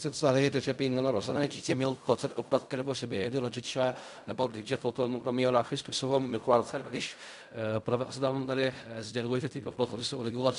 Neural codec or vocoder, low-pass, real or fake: codec, 16 kHz in and 24 kHz out, 0.4 kbps, LongCat-Audio-Codec, fine tuned four codebook decoder; 10.8 kHz; fake